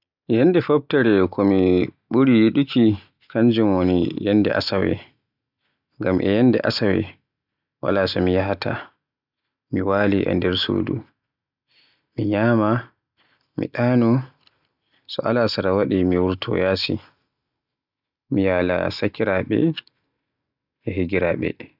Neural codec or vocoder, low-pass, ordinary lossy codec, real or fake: none; 5.4 kHz; none; real